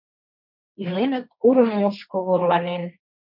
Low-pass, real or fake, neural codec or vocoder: 5.4 kHz; fake; codec, 16 kHz, 1.1 kbps, Voila-Tokenizer